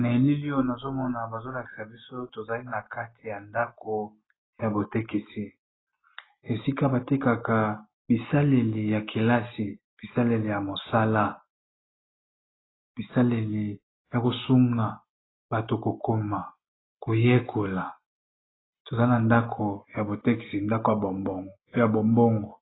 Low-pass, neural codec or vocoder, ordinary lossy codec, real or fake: 7.2 kHz; none; AAC, 16 kbps; real